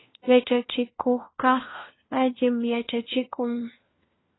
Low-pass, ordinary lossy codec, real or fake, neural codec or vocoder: 7.2 kHz; AAC, 16 kbps; fake; codec, 16 kHz, 1 kbps, FunCodec, trained on LibriTTS, 50 frames a second